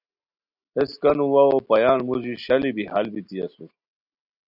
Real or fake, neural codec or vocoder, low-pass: real; none; 5.4 kHz